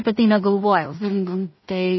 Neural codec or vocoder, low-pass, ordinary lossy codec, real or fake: codec, 16 kHz in and 24 kHz out, 0.4 kbps, LongCat-Audio-Codec, two codebook decoder; 7.2 kHz; MP3, 24 kbps; fake